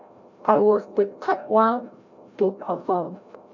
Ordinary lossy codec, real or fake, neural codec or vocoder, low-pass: none; fake; codec, 16 kHz, 0.5 kbps, FreqCodec, larger model; 7.2 kHz